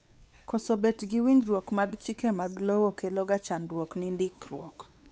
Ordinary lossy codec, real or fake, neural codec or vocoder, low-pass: none; fake; codec, 16 kHz, 4 kbps, X-Codec, WavLM features, trained on Multilingual LibriSpeech; none